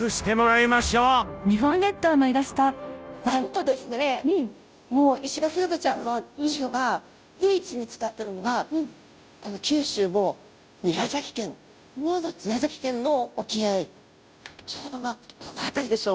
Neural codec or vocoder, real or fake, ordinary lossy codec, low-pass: codec, 16 kHz, 0.5 kbps, FunCodec, trained on Chinese and English, 25 frames a second; fake; none; none